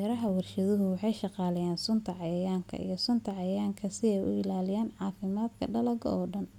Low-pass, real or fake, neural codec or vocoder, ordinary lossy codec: 19.8 kHz; real; none; none